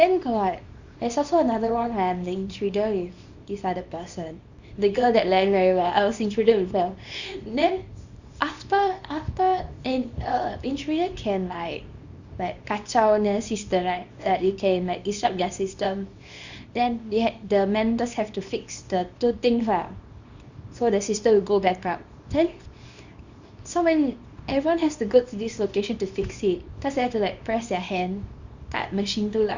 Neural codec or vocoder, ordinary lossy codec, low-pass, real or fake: codec, 24 kHz, 0.9 kbps, WavTokenizer, small release; Opus, 64 kbps; 7.2 kHz; fake